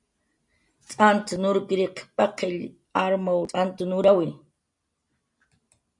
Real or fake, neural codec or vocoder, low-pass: real; none; 10.8 kHz